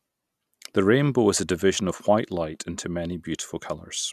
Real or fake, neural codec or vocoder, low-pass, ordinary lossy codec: real; none; 14.4 kHz; none